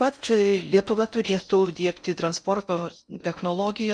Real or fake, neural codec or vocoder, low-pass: fake; codec, 16 kHz in and 24 kHz out, 0.6 kbps, FocalCodec, streaming, 4096 codes; 9.9 kHz